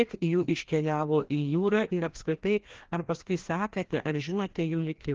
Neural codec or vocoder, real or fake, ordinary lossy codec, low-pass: codec, 16 kHz, 1 kbps, FreqCodec, larger model; fake; Opus, 16 kbps; 7.2 kHz